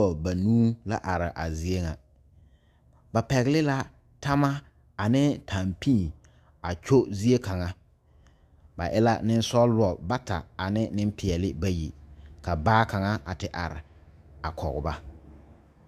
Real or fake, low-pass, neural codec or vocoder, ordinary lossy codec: fake; 14.4 kHz; autoencoder, 48 kHz, 128 numbers a frame, DAC-VAE, trained on Japanese speech; Opus, 64 kbps